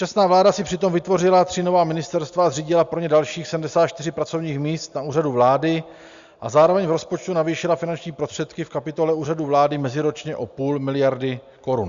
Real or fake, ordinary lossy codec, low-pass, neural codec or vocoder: real; Opus, 64 kbps; 7.2 kHz; none